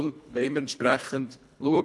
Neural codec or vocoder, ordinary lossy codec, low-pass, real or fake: codec, 24 kHz, 1.5 kbps, HILCodec; none; none; fake